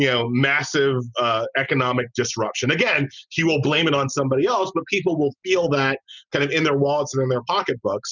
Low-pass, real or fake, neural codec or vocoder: 7.2 kHz; real; none